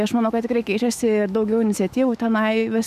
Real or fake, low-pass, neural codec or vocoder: fake; 14.4 kHz; autoencoder, 48 kHz, 128 numbers a frame, DAC-VAE, trained on Japanese speech